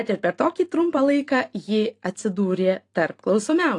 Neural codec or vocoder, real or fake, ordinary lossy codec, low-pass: vocoder, 44.1 kHz, 128 mel bands every 512 samples, BigVGAN v2; fake; AAC, 48 kbps; 10.8 kHz